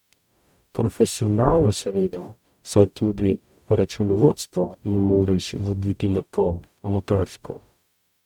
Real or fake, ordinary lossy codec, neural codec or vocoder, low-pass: fake; none; codec, 44.1 kHz, 0.9 kbps, DAC; 19.8 kHz